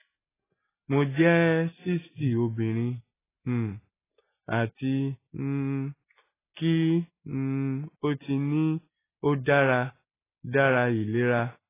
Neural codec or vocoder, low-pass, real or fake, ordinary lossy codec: none; 3.6 kHz; real; AAC, 16 kbps